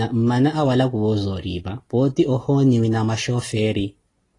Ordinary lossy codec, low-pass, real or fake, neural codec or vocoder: AAC, 32 kbps; 10.8 kHz; real; none